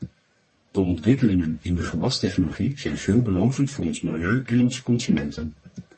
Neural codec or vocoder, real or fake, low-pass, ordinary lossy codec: codec, 44.1 kHz, 1.7 kbps, Pupu-Codec; fake; 10.8 kHz; MP3, 32 kbps